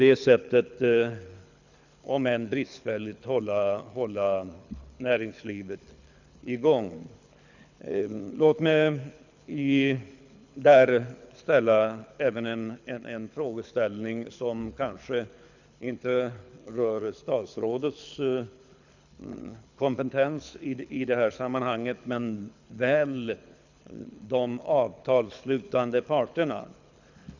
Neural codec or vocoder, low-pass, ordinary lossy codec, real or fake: codec, 24 kHz, 6 kbps, HILCodec; 7.2 kHz; none; fake